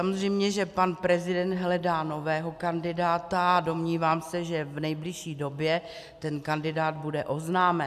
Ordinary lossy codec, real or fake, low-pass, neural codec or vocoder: AAC, 96 kbps; real; 14.4 kHz; none